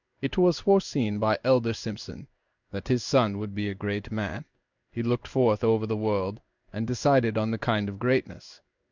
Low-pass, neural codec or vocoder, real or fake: 7.2 kHz; codec, 16 kHz in and 24 kHz out, 1 kbps, XY-Tokenizer; fake